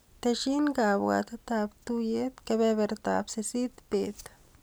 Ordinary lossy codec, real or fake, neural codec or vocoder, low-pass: none; real; none; none